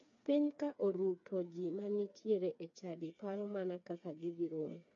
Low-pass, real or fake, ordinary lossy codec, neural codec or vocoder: 7.2 kHz; fake; MP3, 48 kbps; codec, 16 kHz, 4 kbps, FreqCodec, smaller model